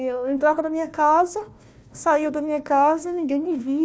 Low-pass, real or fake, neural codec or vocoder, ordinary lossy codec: none; fake; codec, 16 kHz, 1 kbps, FunCodec, trained on Chinese and English, 50 frames a second; none